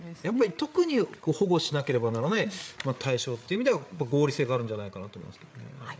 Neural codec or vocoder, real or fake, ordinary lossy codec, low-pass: codec, 16 kHz, 8 kbps, FreqCodec, larger model; fake; none; none